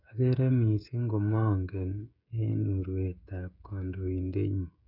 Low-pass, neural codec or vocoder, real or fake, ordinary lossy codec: 5.4 kHz; codec, 16 kHz, 16 kbps, FreqCodec, smaller model; fake; none